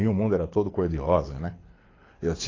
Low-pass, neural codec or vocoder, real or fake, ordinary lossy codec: 7.2 kHz; codec, 24 kHz, 6 kbps, HILCodec; fake; AAC, 32 kbps